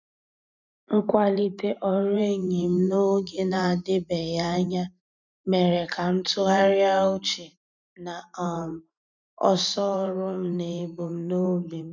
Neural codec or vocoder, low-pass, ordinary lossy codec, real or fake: vocoder, 44.1 kHz, 128 mel bands every 512 samples, BigVGAN v2; 7.2 kHz; none; fake